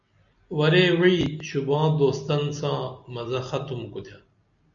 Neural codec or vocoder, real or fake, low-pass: none; real; 7.2 kHz